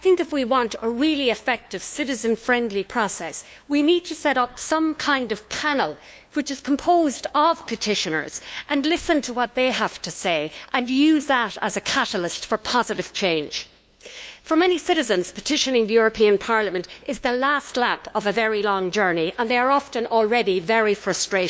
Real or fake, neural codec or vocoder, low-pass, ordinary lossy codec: fake; codec, 16 kHz, 2 kbps, FunCodec, trained on LibriTTS, 25 frames a second; none; none